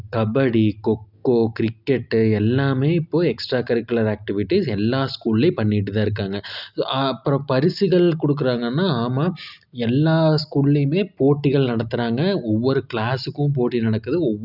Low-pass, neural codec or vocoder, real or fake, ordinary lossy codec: 5.4 kHz; none; real; none